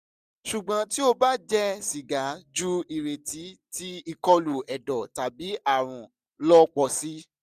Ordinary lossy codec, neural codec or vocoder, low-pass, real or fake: none; none; 14.4 kHz; real